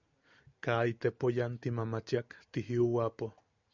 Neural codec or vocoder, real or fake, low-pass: none; real; 7.2 kHz